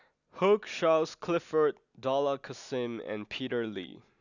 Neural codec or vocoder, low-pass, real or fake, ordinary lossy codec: none; 7.2 kHz; real; none